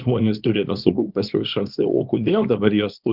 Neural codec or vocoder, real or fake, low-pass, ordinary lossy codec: codec, 24 kHz, 0.9 kbps, WavTokenizer, small release; fake; 5.4 kHz; Opus, 32 kbps